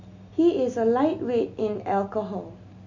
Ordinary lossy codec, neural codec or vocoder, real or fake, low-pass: none; none; real; 7.2 kHz